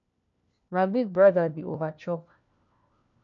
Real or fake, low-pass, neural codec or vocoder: fake; 7.2 kHz; codec, 16 kHz, 1 kbps, FunCodec, trained on LibriTTS, 50 frames a second